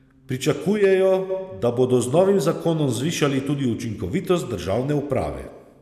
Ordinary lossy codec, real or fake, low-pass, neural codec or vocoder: none; real; 14.4 kHz; none